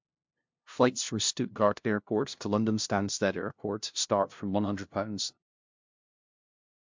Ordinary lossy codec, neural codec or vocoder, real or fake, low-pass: MP3, 64 kbps; codec, 16 kHz, 0.5 kbps, FunCodec, trained on LibriTTS, 25 frames a second; fake; 7.2 kHz